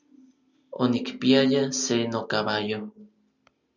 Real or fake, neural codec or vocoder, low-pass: real; none; 7.2 kHz